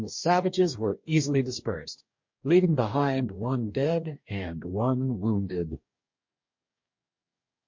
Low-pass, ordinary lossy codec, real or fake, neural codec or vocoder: 7.2 kHz; MP3, 48 kbps; fake; codec, 44.1 kHz, 2.6 kbps, DAC